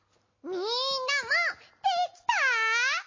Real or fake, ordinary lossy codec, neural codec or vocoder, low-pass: real; MP3, 32 kbps; none; 7.2 kHz